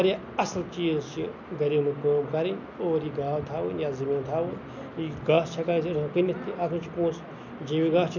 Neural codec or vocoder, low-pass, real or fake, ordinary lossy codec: none; 7.2 kHz; real; none